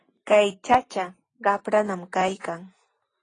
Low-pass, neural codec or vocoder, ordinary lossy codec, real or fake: 9.9 kHz; none; AAC, 32 kbps; real